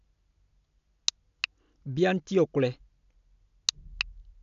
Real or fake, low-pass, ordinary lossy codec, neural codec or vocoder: real; 7.2 kHz; none; none